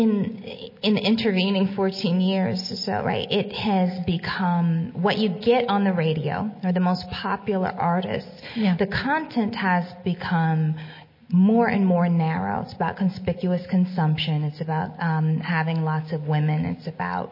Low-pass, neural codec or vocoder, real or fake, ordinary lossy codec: 5.4 kHz; none; real; MP3, 24 kbps